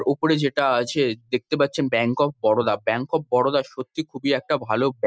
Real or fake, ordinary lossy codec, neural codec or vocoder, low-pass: real; none; none; none